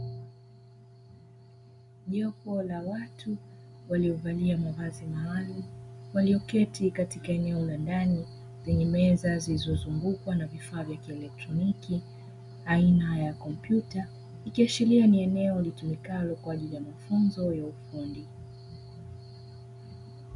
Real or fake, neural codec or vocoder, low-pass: real; none; 10.8 kHz